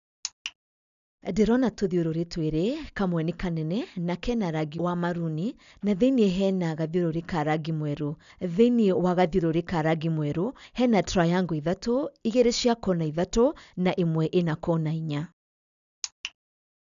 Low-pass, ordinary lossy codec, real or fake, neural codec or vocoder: 7.2 kHz; none; real; none